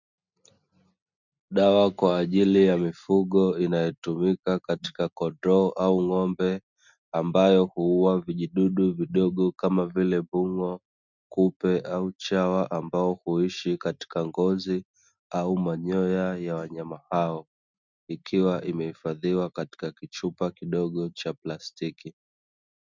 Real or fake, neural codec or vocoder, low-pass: real; none; 7.2 kHz